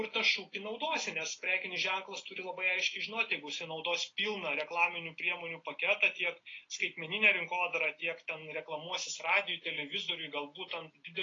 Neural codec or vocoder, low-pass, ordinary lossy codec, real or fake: none; 7.2 kHz; AAC, 32 kbps; real